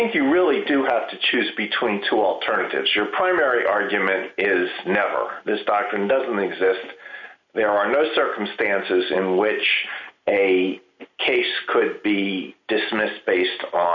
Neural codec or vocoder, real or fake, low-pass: none; real; 7.2 kHz